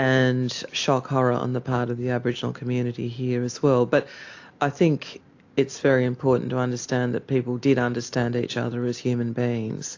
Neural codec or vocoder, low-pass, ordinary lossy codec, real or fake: none; 7.2 kHz; AAC, 48 kbps; real